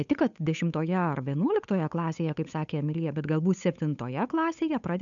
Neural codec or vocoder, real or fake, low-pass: codec, 16 kHz, 8 kbps, FunCodec, trained on Chinese and English, 25 frames a second; fake; 7.2 kHz